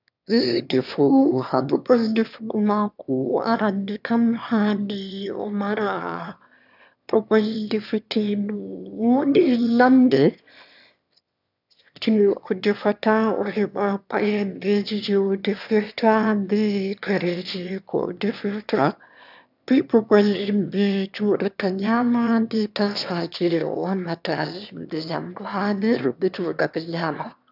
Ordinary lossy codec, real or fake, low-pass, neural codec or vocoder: none; fake; 5.4 kHz; autoencoder, 22.05 kHz, a latent of 192 numbers a frame, VITS, trained on one speaker